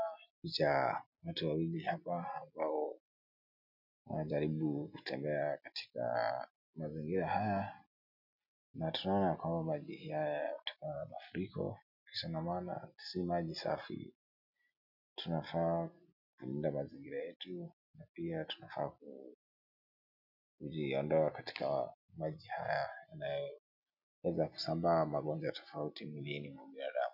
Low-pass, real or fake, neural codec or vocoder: 5.4 kHz; real; none